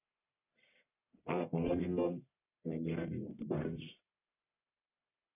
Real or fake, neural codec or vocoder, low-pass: fake; codec, 44.1 kHz, 1.7 kbps, Pupu-Codec; 3.6 kHz